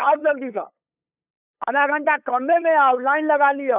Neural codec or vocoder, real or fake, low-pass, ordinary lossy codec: codec, 16 kHz, 8 kbps, FunCodec, trained on LibriTTS, 25 frames a second; fake; 3.6 kHz; none